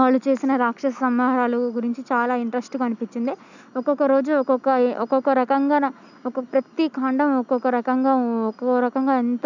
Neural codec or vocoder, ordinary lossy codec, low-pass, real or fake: none; none; 7.2 kHz; real